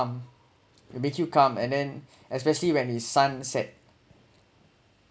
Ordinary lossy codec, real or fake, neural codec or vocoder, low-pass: none; real; none; none